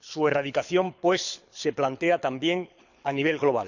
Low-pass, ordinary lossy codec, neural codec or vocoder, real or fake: 7.2 kHz; none; codec, 24 kHz, 6 kbps, HILCodec; fake